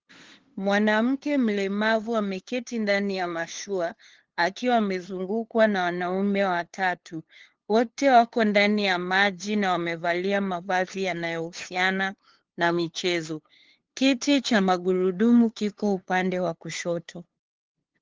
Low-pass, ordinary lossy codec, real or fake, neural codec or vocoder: 7.2 kHz; Opus, 16 kbps; fake; codec, 16 kHz, 2 kbps, FunCodec, trained on LibriTTS, 25 frames a second